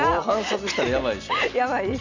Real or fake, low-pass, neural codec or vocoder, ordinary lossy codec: real; 7.2 kHz; none; none